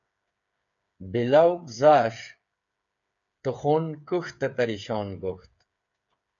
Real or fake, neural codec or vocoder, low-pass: fake; codec, 16 kHz, 8 kbps, FreqCodec, smaller model; 7.2 kHz